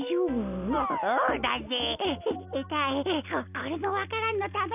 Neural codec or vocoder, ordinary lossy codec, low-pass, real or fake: none; none; 3.6 kHz; real